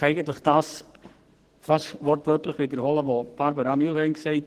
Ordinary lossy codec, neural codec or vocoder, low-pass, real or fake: Opus, 16 kbps; codec, 44.1 kHz, 2.6 kbps, SNAC; 14.4 kHz; fake